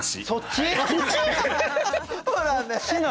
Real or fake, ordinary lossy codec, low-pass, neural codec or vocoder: real; none; none; none